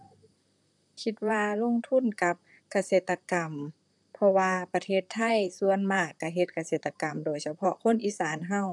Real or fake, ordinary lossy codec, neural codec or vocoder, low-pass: fake; none; vocoder, 44.1 kHz, 128 mel bands, Pupu-Vocoder; 10.8 kHz